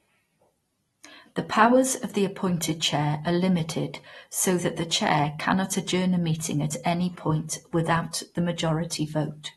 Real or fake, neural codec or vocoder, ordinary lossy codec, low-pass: real; none; AAC, 32 kbps; 19.8 kHz